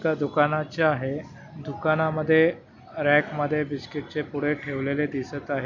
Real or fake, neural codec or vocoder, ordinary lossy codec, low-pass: real; none; none; 7.2 kHz